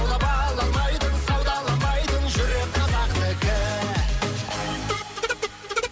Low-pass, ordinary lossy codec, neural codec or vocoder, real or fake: none; none; none; real